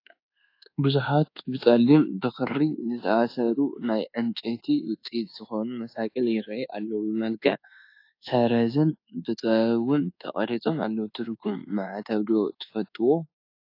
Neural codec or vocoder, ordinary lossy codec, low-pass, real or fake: codec, 24 kHz, 1.2 kbps, DualCodec; AAC, 32 kbps; 5.4 kHz; fake